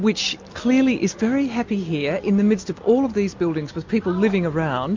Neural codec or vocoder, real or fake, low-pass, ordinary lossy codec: none; real; 7.2 kHz; MP3, 48 kbps